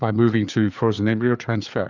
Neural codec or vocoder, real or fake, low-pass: codec, 16 kHz, 4 kbps, FreqCodec, larger model; fake; 7.2 kHz